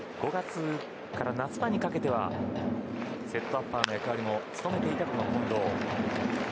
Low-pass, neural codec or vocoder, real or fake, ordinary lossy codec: none; none; real; none